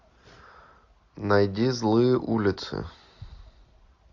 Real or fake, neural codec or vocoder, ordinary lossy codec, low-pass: real; none; AAC, 48 kbps; 7.2 kHz